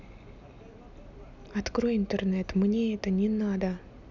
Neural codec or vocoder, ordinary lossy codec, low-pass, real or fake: none; none; 7.2 kHz; real